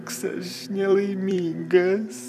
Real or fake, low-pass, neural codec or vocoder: fake; 14.4 kHz; vocoder, 44.1 kHz, 128 mel bands every 256 samples, BigVGAN v2